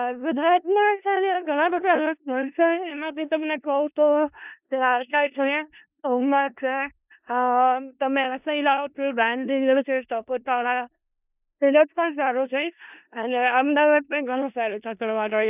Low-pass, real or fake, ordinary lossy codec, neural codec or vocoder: 3.6 kHz; fake; none; codec, 16 kHz in and 24 kHz out, 0.4 kbps, LongCat-Audio-Codec, four codebook decoder